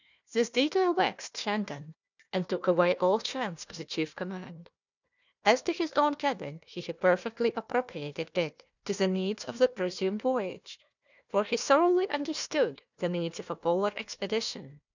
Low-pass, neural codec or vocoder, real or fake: 7.2 kHz; codec, 16 kHz, 1 kbps, FunCodec, trained on Chinese and English, 50 frames a second; fake